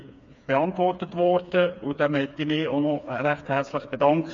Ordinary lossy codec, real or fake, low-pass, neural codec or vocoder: MP3, 48 kbps; fake; 7.2 kHz; codec, 16 kHz, 4 kbps, FreqCodec, smaller model